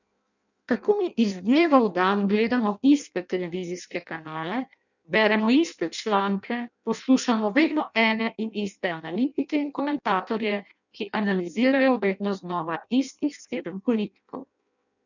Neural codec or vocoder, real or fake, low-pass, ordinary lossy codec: codec, 16 kHz in and 24 kHz out, 0.6 kbps, FireRedTTS-2 codec; fake; 7.2 kHz; none